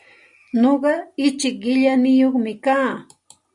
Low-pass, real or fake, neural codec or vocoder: 10.8 kHz; real; none